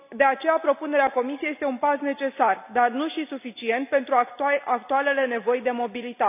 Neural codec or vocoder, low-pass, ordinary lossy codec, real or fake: none; 3.6 kHz; none; real